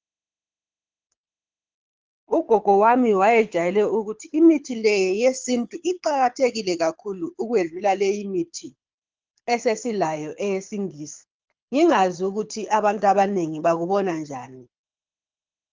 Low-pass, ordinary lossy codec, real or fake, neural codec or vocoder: 7.2 kHz; Opus, 24 kbps; fake; codec, 24 kHz, 6 kbps, HILCodec